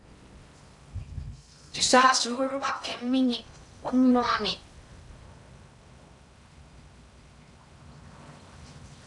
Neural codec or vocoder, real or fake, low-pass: codec, 16 kHz in and 24 kHz out, 0.6 kbps, FocalCodec, streaming, 4096 codes; fake; 10.8 kHz